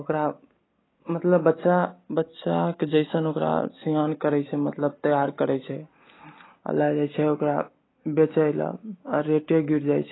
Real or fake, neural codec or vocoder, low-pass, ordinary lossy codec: real; none; 7.2 kHz; AAC, 16 kbps